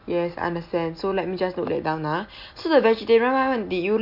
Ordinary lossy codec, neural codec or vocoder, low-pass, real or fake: MP3, 48 kbps; none; 5.4 kHz; real